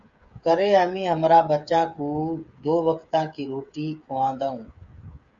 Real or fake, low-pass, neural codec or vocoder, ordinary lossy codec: fake; 7.2 kHz; codec, 16 kHz, 8 kbps, FreqCodec, smaller model; Opus, 64 kbps